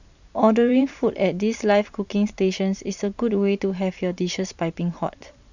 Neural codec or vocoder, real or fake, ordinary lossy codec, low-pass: vocoder, 44.1 kHz, 128 mel bands every 512 samples, BigVGAN v2; fake; none; 7.2 kHz